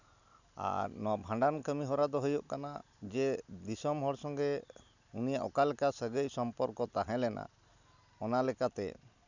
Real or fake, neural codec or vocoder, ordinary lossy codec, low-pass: real; none; none; 7.2 kHz